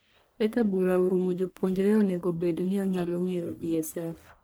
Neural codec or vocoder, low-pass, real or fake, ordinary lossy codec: codec, 44.1 kHz, 1.7 kbps, Pupu-Codec; none; fake; none